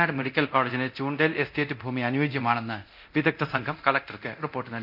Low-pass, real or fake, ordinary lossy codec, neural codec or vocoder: 5.4 kHz; fake; none; codec, 24 kHz, 0.9 kbps, DualCodec